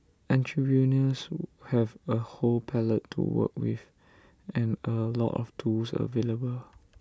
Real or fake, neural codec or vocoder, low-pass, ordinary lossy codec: real; none; none; none